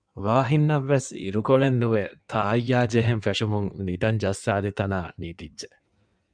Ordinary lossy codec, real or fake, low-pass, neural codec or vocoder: MP3, 96 kbps; fake; 9.9 kHz; codec, 16 kHz in and 24 kHz out, 1.1 kbps, FireRedTTS-2 codec